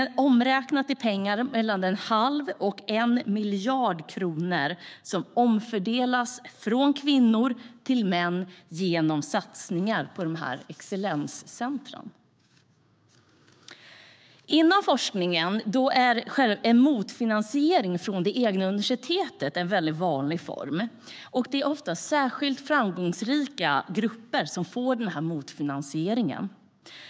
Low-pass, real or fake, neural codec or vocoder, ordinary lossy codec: none; fake; codec, 16 kHz, 6 kbps, DAC; none